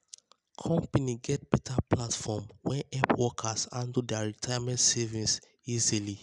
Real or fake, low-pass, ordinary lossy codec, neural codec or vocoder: real; 10.8 kHz; none; none